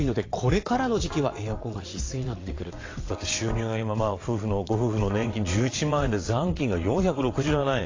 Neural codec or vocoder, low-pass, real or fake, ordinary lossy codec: vocoder, 22.05 kHz, 80 mel bands, WaveNeXt; 7.2 kHz; fake; AAC, 32 kbps